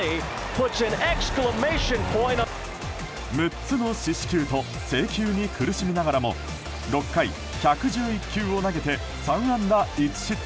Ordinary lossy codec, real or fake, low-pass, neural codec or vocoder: none; real; none; none